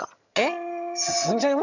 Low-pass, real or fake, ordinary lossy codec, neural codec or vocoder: 7.2 kHz; fake; none; vocoder, 22.05 kHz, 80 mel bands, HiFi-GAN